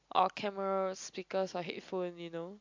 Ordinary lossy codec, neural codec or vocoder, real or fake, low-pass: MP3, 64 kbps; none; real; 7.2 kHz